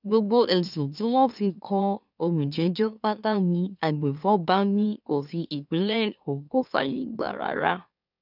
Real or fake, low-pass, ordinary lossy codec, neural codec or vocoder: fake; 5.4 kHz; none; autoencoder, 44.1 kHz, a latent of 192 numbers a frame, MeloTTS